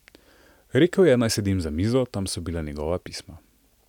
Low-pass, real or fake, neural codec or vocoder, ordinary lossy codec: 19.8 kHz; real; none; none